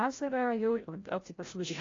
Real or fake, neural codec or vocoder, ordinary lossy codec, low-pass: fake; codec, 16 kHz, 0.5 kbps, FreqCodec, larger model; AAC, 32 kbps; 7.2 kHz